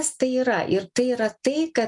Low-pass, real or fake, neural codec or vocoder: 10.8 kHz; real; none